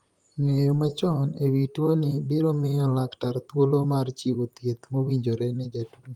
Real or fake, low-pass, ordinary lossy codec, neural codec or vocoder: fake; 19.8 kHz; Opus, 32 kbps; vocoder, 44.1 kHz, 128 mel bands, Pupu-Vocoder